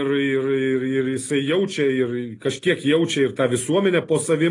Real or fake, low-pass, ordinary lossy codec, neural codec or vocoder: real; 10.8 kHz; AAC, 32 kbps; none